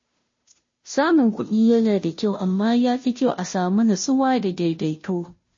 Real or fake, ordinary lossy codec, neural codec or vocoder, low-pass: fake; MP3, 32 kbps; codec, 16 kHz, 0.5 kbps, FunCodec, trained on Chinese and English, 25 frames a second; 7.2 kHz